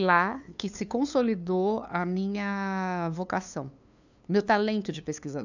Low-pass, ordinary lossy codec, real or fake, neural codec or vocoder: 7.2 kHz; none; fake; codec, 16 kHz, 2 kbps, FunCodec, trained on LibriTTS, 25 frames a second